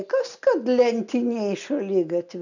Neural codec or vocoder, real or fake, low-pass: none; real; 7.2 kHz